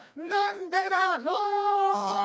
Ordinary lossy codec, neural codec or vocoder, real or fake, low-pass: none; codec, 16 kHz, 1 kbps, FreqCodec, larger model; fake; none